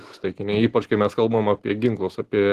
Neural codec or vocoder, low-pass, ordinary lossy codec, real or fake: none; 14.4 kHz; Opus, 16 kbps; real